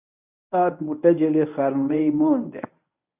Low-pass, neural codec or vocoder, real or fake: 3.6 kHz; codec, 24 kHz, 0.9 kbps, WavTokenizer, medium speech release version 1; fake